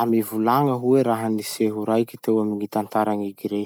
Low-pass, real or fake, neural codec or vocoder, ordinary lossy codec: none; real; none; none